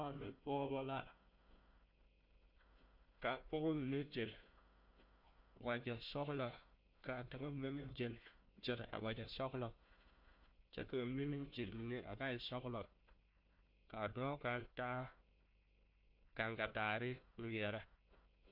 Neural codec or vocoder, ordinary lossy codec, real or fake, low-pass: codec, 16 kHz, 1 kbps, FunCodec, trained on Chinese and English, 50 frames a second; MP3, 48 kbps; fake; 5.4 kHz